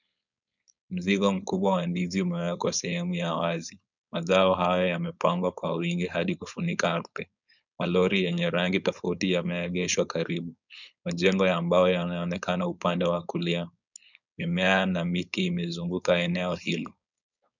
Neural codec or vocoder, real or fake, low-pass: codec, 16 kHz, 4.8 kbps, FACodec; fake; 7.2 kHz